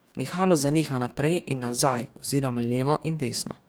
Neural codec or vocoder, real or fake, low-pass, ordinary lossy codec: codec, 44.1 kHz, 2.6 kbps, DAC; fake; none; none